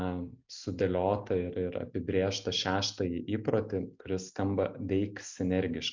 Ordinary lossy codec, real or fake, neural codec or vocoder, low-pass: MP3, 64 kbps; real; none; 7.2 kHz